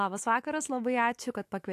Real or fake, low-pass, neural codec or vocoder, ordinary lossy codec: fake; 14.4 kHz; autoencoder, 48 kHz, 128 numbers a frame, DAC-VAE, trained on Japanese speech; AAC, 64 kbps